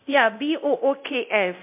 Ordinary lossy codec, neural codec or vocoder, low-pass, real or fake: MP3, 32 kbps; codec, 24 kHz, 0.9 kbps, DualCodec; 3.6 kHz; fake